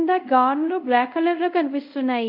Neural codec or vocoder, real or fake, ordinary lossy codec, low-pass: codec, 24 kHz, 0.5 kbps, DualCodec; fake; none; 5.4 kHz